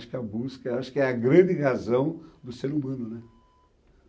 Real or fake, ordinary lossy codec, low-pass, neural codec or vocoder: real; none; none; none